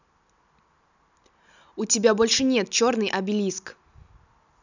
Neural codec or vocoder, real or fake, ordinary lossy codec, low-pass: none; real; none; 7.2 kHz